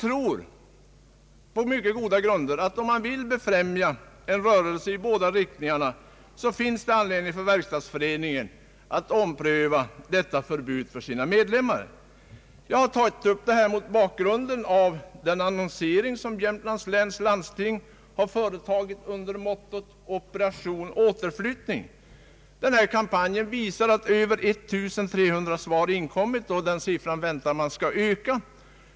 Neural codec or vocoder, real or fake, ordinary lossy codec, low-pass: none; real; none; none